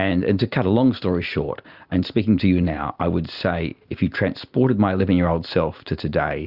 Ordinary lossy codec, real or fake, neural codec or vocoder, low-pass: Opus, 64 kbps; real; none; 5.4 kHz